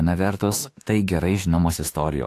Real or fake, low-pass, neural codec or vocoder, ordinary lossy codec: fake; 14.4 kHz; autoencoder, 48 kHz, 32 numbers a frame, DAC-VAE, trained on Japanese speech; AAC, 64 kbps